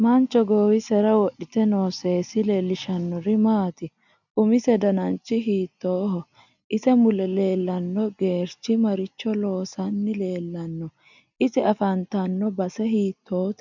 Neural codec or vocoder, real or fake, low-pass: none; real; 7.2 kHz